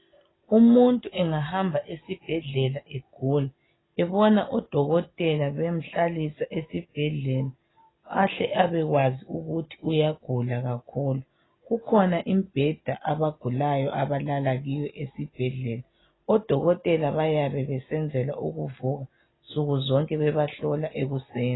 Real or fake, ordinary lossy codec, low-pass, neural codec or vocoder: real; AAC, 16 kbps; 7.2 kHz; none